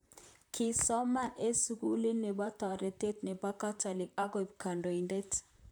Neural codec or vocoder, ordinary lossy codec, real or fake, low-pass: vocoder, 44.1 kHz, 128 mel bands, Pupu-Vocoder; none; fake; none